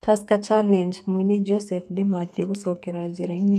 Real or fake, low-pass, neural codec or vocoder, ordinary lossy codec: fake; 14.4 kHz; codec, 32 kHz, 1.9 kbps, SNAC; none